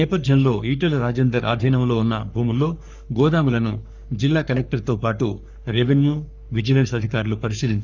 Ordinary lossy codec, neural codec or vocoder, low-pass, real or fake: none; codec, 44.1 kHz, 3.4 kbps, Pupu-Codec; 7.2 kHz; fake